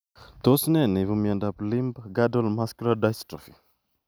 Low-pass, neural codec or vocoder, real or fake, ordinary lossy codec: none; none; real; none